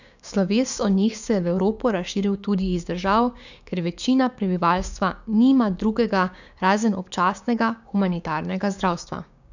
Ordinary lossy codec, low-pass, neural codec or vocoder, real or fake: none; 7.2 kHz; codec, 16 kHz, 6 kbps, DAC; fake